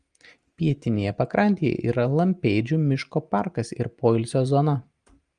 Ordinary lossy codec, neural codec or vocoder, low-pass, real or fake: Opus, 32 kbps; none; 9.9 kHz; real